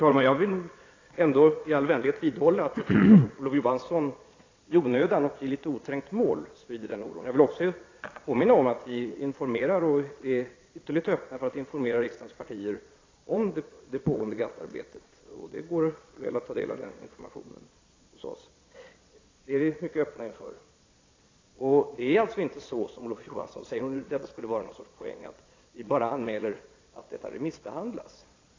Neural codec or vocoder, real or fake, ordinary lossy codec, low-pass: vocoder, 22.05 kHz, 80 mel bands, Vocos; fake; AAC, 32 kbps; 7.2 kHz